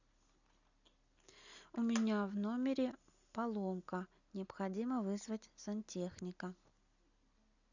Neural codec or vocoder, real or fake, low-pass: none; real; 7.2 kHz